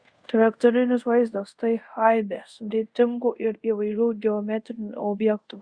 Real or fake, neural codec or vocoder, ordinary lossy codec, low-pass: fake; codec, 24 kHz, 0.5 kbps, DualCodec; Opus, 64 kbps; 9.9 kHz